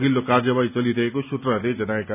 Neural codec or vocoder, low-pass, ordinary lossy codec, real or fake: none; 3.6 kHz; none; real